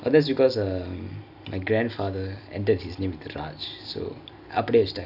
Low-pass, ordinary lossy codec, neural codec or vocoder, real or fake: 5.4 kHz; AAC, 48 kbps; none; real